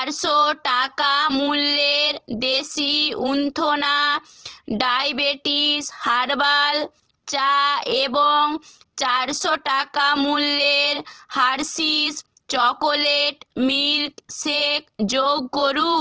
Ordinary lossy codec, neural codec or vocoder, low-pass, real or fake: Opus, 16 kbps; vocoder, 44.1 kHz, 128 mel bands every 512 samples, BigVGAN v2; 7.2 kHz; fake